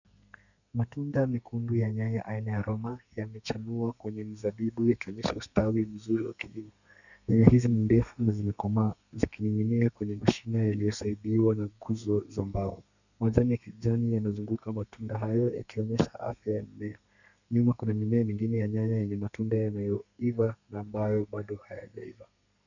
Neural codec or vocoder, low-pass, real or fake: codec, 32 kHz, 1.9 kbps, SNAC; 7.2 kHz; fake